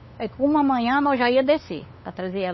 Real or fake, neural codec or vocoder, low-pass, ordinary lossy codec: fake; codec, 16 kHz, 8 kbps, FunCodec, trained on LibriTTS, 25 frames a second; 7.2 kHz; MP3, 24 kbps